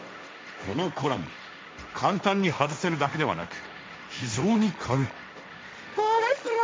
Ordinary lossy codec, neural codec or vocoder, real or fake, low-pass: none; codec, 16 kHz, 1.1 kbps, Voila-Tokenizer; fake; none